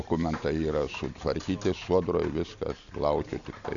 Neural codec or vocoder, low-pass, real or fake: none; 7.2 kHz; real